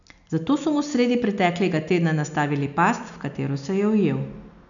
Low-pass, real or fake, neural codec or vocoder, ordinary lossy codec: 7.2 kHz; real; none; none